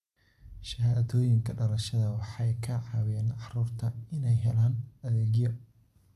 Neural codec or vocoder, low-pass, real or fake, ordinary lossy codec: none; 14.4 kHz; real; AAC, 96 kbps